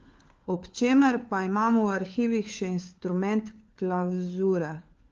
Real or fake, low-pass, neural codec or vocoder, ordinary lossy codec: fake; 7.2 kHz; codec, 16 kHz, 4 kbps, FunCodec, trained on LibriTTS, 50 frames a second; Opus, 32 kbps